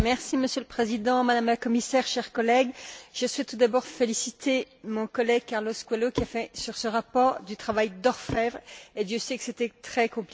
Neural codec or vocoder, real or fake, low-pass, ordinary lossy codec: none; real; none; none